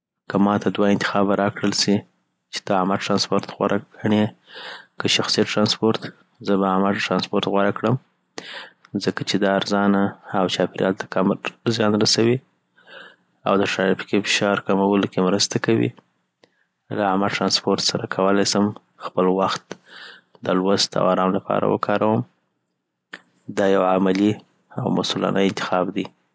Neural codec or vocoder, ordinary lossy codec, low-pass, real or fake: none; none; none; real